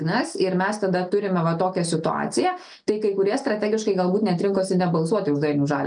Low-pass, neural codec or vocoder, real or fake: 9.9 kHz; none; real